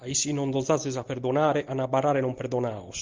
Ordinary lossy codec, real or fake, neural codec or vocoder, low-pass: Opus, 24 kbps; real; none; 7.2 kHz